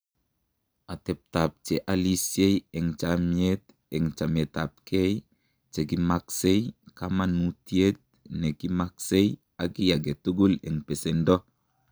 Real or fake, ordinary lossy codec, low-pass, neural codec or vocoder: real; none; none; none